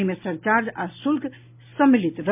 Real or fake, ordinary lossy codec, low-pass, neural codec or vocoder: real; MP3, 32 kbps; 3.6 kHz; none